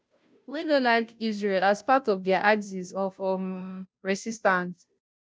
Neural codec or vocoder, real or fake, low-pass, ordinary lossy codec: codec, 16 kHz, 0.5 kbps, FunCodec, trained on Chinese and English, 25 frames a second; fake; none; none